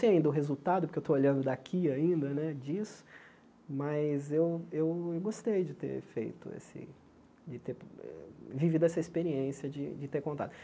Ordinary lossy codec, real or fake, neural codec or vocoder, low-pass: none; real; none; none